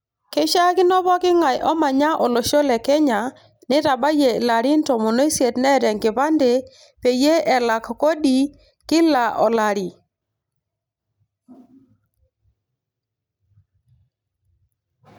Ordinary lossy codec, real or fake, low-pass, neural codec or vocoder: none; real; none; none